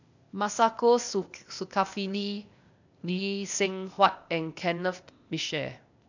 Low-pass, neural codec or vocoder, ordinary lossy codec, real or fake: 7.2 kHz; codec, 16 kHz, 0.8 kbps, ZipCodec; none; fake